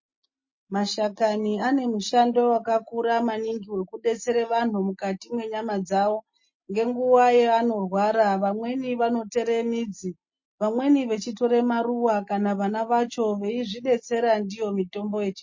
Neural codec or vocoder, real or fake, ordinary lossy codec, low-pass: none; real; MP3, 32 kbps; 7.2 kHz